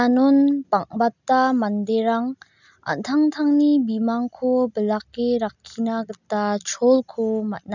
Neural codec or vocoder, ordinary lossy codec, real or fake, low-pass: none; none; real; 7.2 kHz